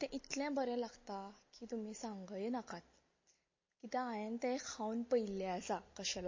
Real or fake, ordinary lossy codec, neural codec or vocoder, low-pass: real; MP3, 32 kbps; none; 7.2 kHz